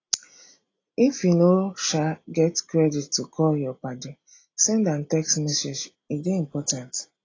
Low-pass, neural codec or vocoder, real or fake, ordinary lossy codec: 7.2 kHz; none; real; AAC, 32 kbps